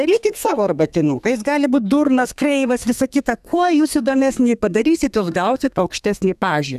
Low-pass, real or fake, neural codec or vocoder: 14.4 kHz; fake; codec, 32 kHz, 1.9 kbps, SNAC